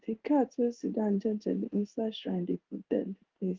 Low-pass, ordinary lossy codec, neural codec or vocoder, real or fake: 7.2 kHz; Opus, 16 kbps; codec, 16 kHz in and 24 kHz out, 1 kbps, XY-Tokenizer; fake